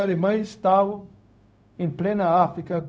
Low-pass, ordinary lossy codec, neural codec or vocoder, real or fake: none; none; codec, 16 kHz, 0.4 kbps, LongCat-Audio-Codec; fake